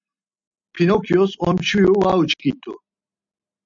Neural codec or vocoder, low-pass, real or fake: none; 7.2 kHz; real